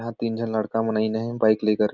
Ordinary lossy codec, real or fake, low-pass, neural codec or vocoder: none; real; 7.2 kHz; none